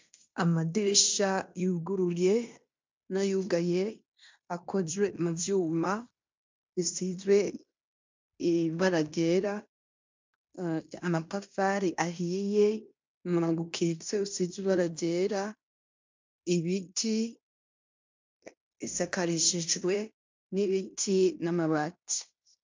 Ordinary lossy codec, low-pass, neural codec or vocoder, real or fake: MP3, 64 kbps; 7.2 kHz; codec, 16 kHz in and 24 kHz out, 0.9 kbps, LongCat-Audio-Codec, fine tuned four codebook decoder; fake